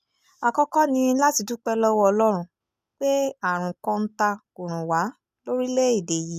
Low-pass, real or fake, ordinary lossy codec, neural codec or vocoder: 14.4 kHz; real; none; none